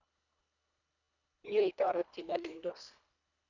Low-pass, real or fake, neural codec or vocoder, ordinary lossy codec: 7.2 kHz; fake; codec, 24 kHz, 1.5 kbps, HILCodec; none